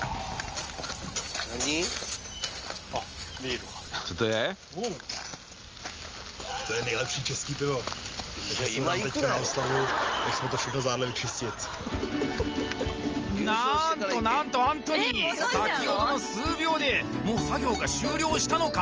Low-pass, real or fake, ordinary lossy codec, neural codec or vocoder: 7.2 kHz; real; Opus, 24 kbps; none